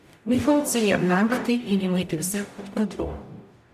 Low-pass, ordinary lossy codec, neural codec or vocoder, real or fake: 14.4 kHz; none; codec, 44.1 kHz, 0.9 kbps, DAC; fake